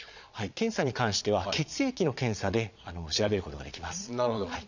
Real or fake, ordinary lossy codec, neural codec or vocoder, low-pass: fake; none; vocoder, 22.05 kHz, 80 mel bands, Vocos; 7.2 kHz